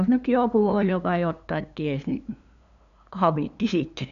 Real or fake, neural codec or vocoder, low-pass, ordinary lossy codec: fake; codec, 16 kHz, 2 kbps, FunCodec, trained on LibriTTS, 25 frames a second; 7.2 kHz; none